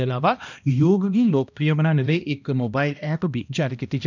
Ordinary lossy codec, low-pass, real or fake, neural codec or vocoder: none; 7.2 kHz; fake; codec, 16 kHz, 1 kbps, X-Codec, HuBERT features, trained on balanced general audio